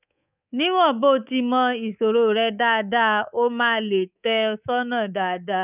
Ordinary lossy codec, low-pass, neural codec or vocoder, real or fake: none; 3.6 kHz; codec, 24 kHz, 3.1 kbps, DualCodec; fake